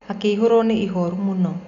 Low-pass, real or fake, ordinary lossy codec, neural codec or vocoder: 7.2 kHz; real; none; none